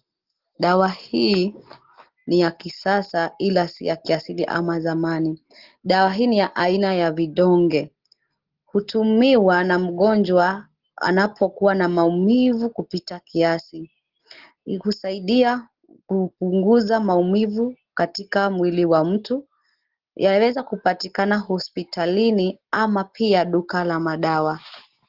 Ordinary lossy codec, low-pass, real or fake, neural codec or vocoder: Opus, 16 kbps; 5.4 kHz; real; none